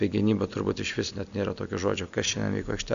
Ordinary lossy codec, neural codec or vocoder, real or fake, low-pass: AAC, 96 kbps; none; real; 7.2 kHz